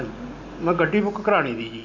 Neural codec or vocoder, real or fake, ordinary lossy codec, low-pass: none; real; AAC, 48 kbps; 7.2 kHz